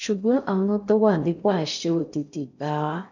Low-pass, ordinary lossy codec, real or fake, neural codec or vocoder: 7.2 kHz; none; fake; codec, 16 kHz in and 24 kHz out, 0.6 kbps, FocalCodec, streaming, 4096 codes